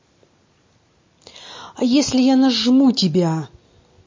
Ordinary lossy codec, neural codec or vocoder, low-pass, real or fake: MP3, 32 kbps; none; 7.2 kHz; real